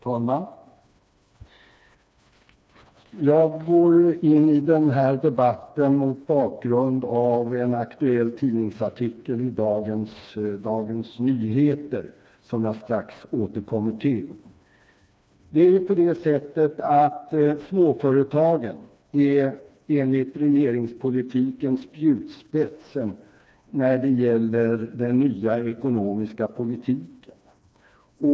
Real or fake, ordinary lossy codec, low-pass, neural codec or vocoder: fake; none; none; codec, 16 kHz, 2 kbps, FreqCodec, smaller model